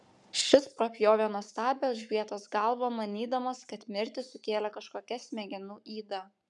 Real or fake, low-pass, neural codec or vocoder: fake; 10.8 kHz; codec, 44.1 kHz, 7.8 kbps, Pupu-Codec